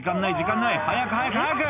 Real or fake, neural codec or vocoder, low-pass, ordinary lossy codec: real; none; 3.6 kHz; none